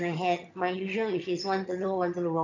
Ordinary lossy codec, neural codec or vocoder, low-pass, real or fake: none; vocoder, 22.05 kHz, 80 mel bands, HiFi-GAN; 7.2 kHz; fake